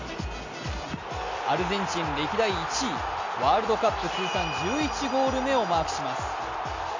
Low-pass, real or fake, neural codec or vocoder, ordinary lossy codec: 7.2 kHz; real; none; AAC, 48 kbps